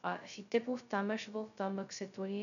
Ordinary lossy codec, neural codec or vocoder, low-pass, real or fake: none; codec, 16 kHz, 0.2 kbps, FocalCodec; 7.2 kHz; fake